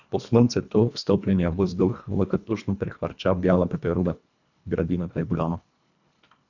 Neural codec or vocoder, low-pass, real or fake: codec, 24 kHz, 1.5 kbps, HILCodec; 7.2 kHz; fake